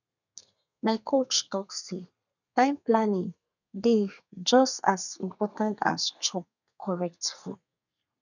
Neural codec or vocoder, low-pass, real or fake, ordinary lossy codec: codec, 32 kHz, 1.9 kbps, SNAC; 7.2 kHz; fake; none